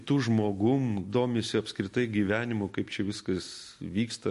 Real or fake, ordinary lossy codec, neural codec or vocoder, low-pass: real; MP3, 48 kbps; none; 14.4 kHz